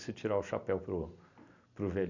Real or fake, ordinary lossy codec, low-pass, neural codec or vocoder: real; none; 7.2 kHz; none